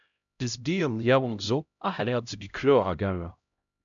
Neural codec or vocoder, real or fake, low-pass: codec, 16 kHz, 0.5 kbps, X-Codec, HuBERT features, trained on LibriSpeech; fake; 7.2 kHz